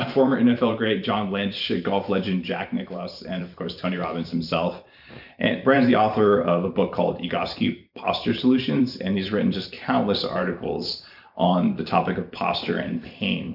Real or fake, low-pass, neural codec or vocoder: fake; 5.4 kHz; vocoder, 44.1 kHz, 128 mel bands every 512 samples, BigVGAN v2